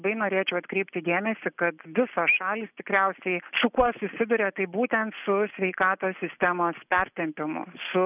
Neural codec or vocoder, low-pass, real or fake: none; 3.6 kHz; real